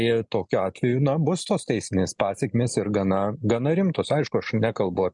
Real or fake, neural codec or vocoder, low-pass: real; none; 10.8 kHz